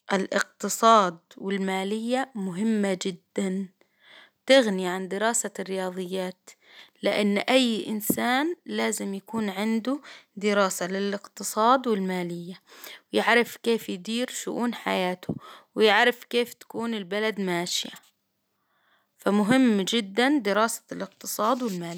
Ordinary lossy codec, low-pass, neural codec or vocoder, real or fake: none; none; none; real